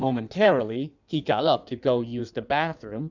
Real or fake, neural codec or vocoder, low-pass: fake; codec, 16 kHz in and 24 kHz out, 1.1 kbps, FireRedTTS-2 codec; 7.2 kHz